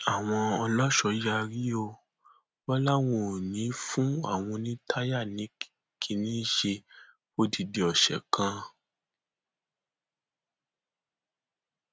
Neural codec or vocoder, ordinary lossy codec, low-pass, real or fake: none; none; none; real